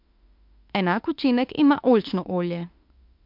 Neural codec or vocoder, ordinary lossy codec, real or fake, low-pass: autoencoder, 48 kHz, 32 numbers a frame, DAC-VAE, trained on Japanese speech; MP3, 48 kbps; fake; 5.4 kHz